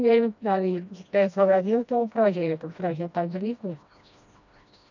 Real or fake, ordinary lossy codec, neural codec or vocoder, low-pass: fake; none; codec, 16 kHz, 1 kbps, FreqCodec, smaller model; 7.2 kHz